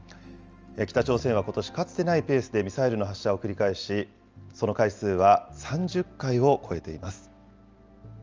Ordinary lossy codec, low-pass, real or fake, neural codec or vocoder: Opus, 24 kbps; 7.2 kHz; real; none